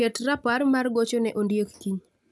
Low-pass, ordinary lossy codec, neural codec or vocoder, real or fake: none; none; none; real